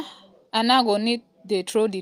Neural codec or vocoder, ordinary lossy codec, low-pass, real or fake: none; Opus, 32 kbps; 14.4 kHz; real